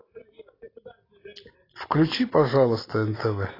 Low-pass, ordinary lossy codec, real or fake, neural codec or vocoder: 5.4 kHz; AAC, 24 kbps; real; none